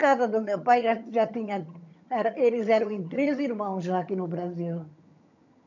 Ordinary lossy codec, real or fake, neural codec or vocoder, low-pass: none; fake; vocoder, 22.05 kHz, 80 mel bands, HiFi-GAN; 7.2 kHz